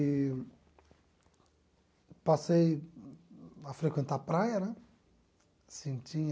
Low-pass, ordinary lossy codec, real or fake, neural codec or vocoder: none; none; real; none